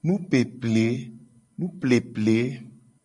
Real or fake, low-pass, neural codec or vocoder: fake; 10.8 kHz; vocoder, 44.1 kHz, 128 mel bands every 512 samples, BigVGAN v2